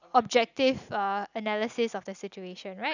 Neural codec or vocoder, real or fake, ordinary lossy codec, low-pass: none; real; none; 7.2 kHz